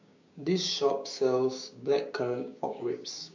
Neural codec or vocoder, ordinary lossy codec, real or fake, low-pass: codec, 16 kHz, 6 kbps, DAC; MP3, 64 kbps; fake; 7.2 kHz